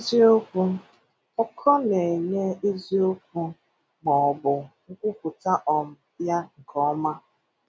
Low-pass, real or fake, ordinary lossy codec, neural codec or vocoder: none; real; none; none